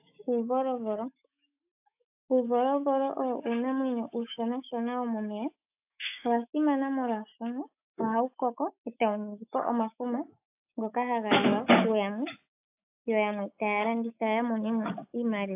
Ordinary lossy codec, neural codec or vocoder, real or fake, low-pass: AAC, 32 kbps; autoencoder, 48 kHz, 128 numbers a frame, DAC-VAE, trained on Japanese speech; fake; 3.6 kHz